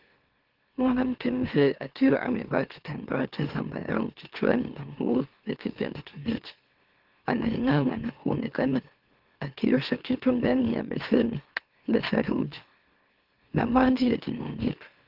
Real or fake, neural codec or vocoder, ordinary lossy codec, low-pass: fake; autoencoder, 44.1 kHz, a latent of 192 numbers a frame, MeloTTS; Opus, 16 kbps; 5.4 kHz